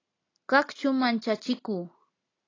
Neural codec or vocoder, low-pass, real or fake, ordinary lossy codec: none; 7.2 kHz; real; AAC, 32 kbps